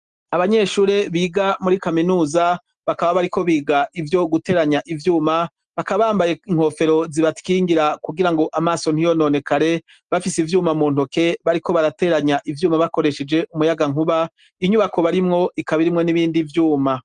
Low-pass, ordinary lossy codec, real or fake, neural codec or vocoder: 9.9 kHz; Opus, 24 kbps; real; none